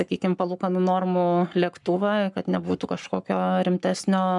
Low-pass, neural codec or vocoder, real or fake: 10.8 kHz; codec, 44.1 kHz, 7.8 kbps, Pupu-Codec; fake